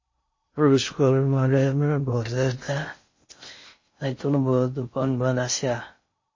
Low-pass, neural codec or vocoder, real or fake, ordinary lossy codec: 7.2 kHz; codec, 16 kHz in and 24 kHz out, 0.6 kbps, FocalCodec, streaming, 2048 codes; fake; MP3, 32 kbps